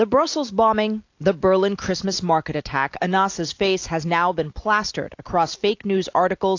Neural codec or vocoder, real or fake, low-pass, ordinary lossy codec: none; real; 7.2 kHz; AAC, 48 kbps